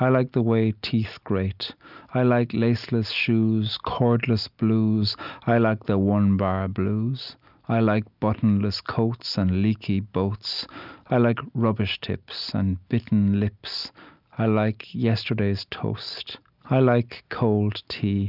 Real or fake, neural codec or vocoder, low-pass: real; none; 5.4 kHz